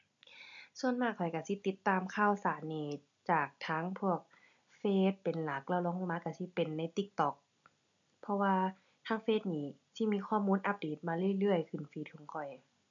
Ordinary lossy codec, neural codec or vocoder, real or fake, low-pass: none; none; real; 7.2 kHz